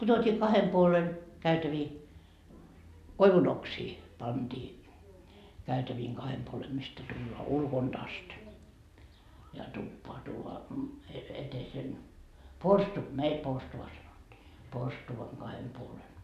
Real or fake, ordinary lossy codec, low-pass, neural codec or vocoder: real; none; 14.4 kHz; none